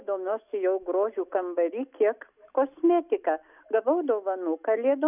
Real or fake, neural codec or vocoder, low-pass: real; none; 3.6 kHz